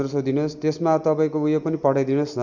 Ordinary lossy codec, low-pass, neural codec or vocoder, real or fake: none; 7.2 kHz; none; real